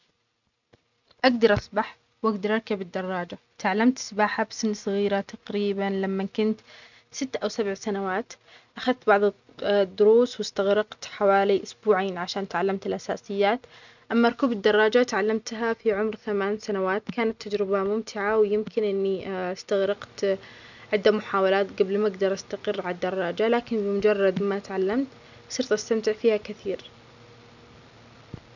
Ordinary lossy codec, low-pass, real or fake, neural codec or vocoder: none; 7.2 kHz; real; none